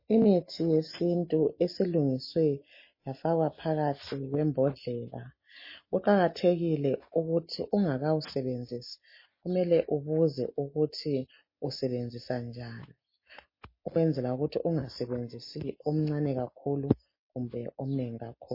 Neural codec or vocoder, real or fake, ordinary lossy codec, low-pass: none; real; MP3, 24 kbps; 5.4 kHz